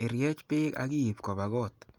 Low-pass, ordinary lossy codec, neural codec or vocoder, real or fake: 19.8 kHz; Opus, 32 kbps; none; real